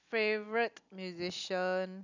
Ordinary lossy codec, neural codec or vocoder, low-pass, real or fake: none; none; 7.2 kHz; real